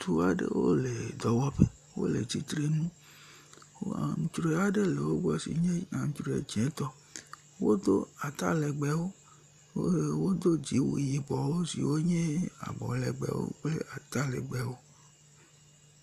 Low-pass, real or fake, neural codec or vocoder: 14.4 kHz; real; none